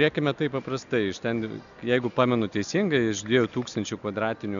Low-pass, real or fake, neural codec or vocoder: 7.2 kHz; real; none